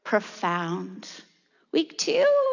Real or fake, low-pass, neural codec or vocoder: real; 7.2 kHz; none